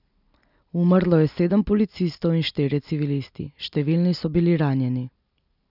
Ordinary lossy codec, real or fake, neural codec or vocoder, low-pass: none; real; none; 5.4 kHz